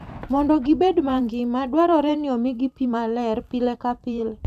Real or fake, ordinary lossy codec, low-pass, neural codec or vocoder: fake; none; 14.4 kHz; vocoder, 44.1 kHz, 128 mel bands every 512 samples, BigVGAN v2